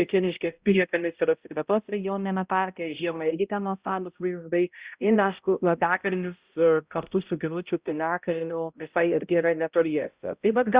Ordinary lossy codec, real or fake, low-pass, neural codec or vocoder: Opus, 64 kbps; fake; 3.6 kHz; codec, 16 kHz, 0.5 kbps, X-Codec, HuBERT features, trained on balanced general audio